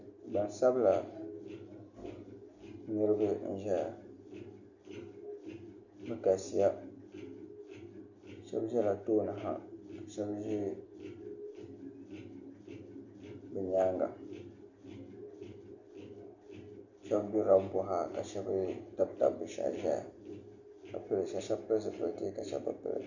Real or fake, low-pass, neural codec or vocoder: real; 7.2 kHz; none